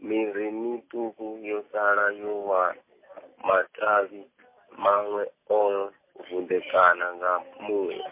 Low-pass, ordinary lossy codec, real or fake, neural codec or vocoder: 3.6 kHz; MP3, 24 kbps; real; none